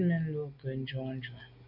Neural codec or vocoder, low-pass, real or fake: none; 5.4 kHz; real